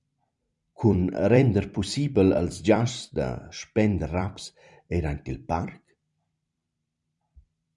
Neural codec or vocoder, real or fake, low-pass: vocoder, 44.1 kHz, 128 mel bands every 256 samples, BigVGAN v2; fake; 10.8 kHz